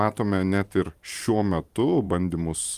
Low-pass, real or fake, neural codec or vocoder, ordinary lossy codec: 14.4 kHz; fake; vocoder, 44.1 kHz, 128 mel bands every 512 samples, BigVGAN v2; Opus, 32 kbps